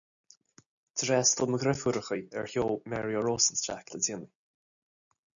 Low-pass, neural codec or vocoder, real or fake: 7.2 kHz; none; real